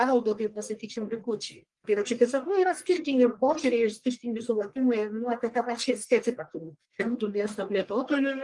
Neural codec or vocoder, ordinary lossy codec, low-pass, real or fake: codec, 44.1 kHz, 1.7 kbps, Pupu-Codec; Opus, 24 kbps; 10.8 kHz; fake